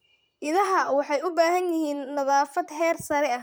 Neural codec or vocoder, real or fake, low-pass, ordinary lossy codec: vocoder, 44.1 kHz, 128 mel bands, Pupu-Vocoder; fake; none; none